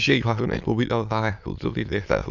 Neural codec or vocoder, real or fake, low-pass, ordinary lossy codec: autoencoder, 22.05 kHz, a latent of 192 numbers a frame, VITS, trained on many speakers; fake; 7.2 kHz; none